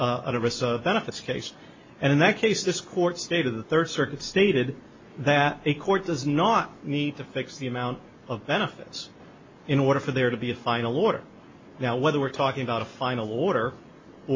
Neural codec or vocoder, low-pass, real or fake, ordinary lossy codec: none; 7.2 kHz; real; MP3, 32 kbps